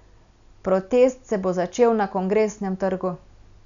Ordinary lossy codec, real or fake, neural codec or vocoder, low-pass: none; real; none; 7.2 kHz